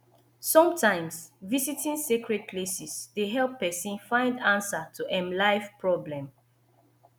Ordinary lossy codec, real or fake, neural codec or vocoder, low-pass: none; real; none; none